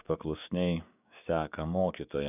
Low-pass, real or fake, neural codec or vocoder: 3.6 kHz; fake; codec, 16 kHz, 6 kbps, DAC